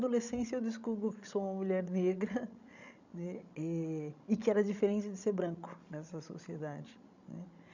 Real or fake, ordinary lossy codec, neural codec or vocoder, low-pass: fake; none; codec, 16 kHz, 16 kbps, FreqCodec, larger model; 7.2 kHz